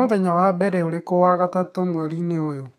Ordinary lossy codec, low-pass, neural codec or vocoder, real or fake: none; 14.4 kHz; codec, 32 kHz, 1.9 kbps, SNAC; fake